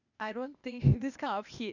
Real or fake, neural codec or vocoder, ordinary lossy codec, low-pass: fake; codec, 16 kHz, 0.8 kbps, ZipCodec; none; 7.2 kHz